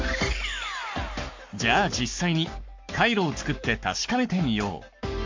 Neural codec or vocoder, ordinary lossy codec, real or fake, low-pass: codec, 44.1 kHz, 7.8 kbps, Pupu-Codec; MP3, 48 kbps; fake; 7.2 kHz